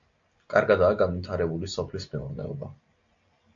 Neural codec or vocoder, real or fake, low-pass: none; real; 7.2 kHz